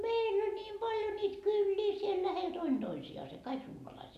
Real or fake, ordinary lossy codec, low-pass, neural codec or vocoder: real; none; 14.4 kHz; none